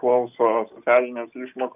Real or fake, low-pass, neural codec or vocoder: real; 3.6 kHz; none